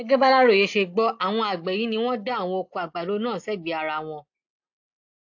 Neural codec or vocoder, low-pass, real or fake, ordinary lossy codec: none; 7.2 kHz; real; AAC, 48 kbps